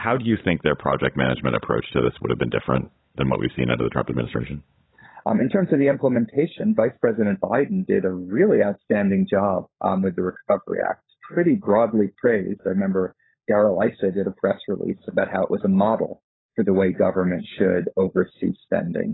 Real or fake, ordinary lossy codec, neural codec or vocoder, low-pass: fake; AAC, 16 kbps; codec, 16 kHz, 16 kbps, FunCodec, trained on LibriTTS, 50 frames a second; 7.2 kHz